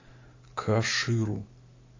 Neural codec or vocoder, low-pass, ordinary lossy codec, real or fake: none; 7.2 kHz; AAC, 32 kbps; real